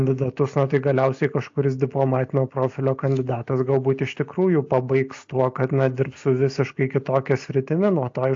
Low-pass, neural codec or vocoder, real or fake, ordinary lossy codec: 7.2 kHz; none; real; AAC, 48 kbps